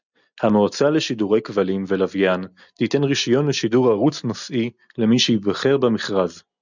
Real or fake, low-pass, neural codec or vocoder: real; 7.2 kHz; none